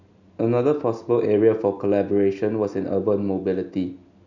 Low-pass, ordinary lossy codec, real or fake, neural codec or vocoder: 7.2 kHz; none; real; none